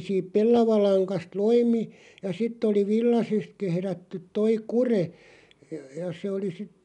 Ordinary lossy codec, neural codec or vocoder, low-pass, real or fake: none; none; 10.8 kHz; real